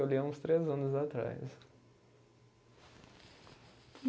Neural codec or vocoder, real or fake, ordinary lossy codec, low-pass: none; real; none; none